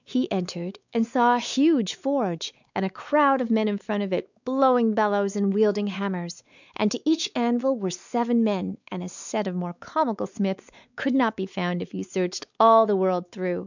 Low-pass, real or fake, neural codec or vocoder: 7.2 kHz; fake; codec, 16 kHz, 4 kbps, X-Codec, WavLM features, trained on Multilingual LibriSpeech